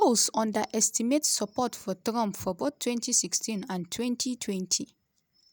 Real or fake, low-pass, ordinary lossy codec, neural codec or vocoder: real; none; none; none